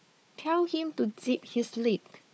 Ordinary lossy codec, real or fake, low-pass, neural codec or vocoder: none; fake; none; codec, 16 kHz, 4 kbps, FunCodec, trained on Chinese and English, 50 frames a second